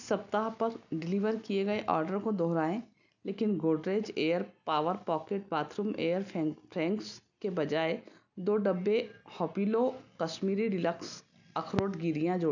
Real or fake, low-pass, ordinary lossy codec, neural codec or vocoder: real; 7.2 kHz; none; none